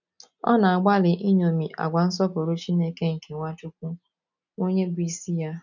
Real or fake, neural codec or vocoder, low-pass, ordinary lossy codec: real; none; 7.2 kHz; none